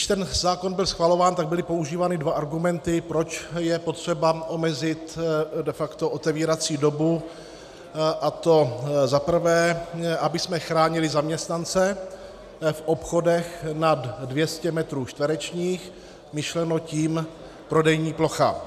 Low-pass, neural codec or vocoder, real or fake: 14.4 kHz; none; real